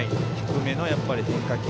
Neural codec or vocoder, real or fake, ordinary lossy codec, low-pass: none; real; none; none